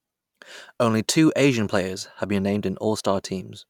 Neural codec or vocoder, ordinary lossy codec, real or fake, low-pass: none; none; real; 19.8 kHz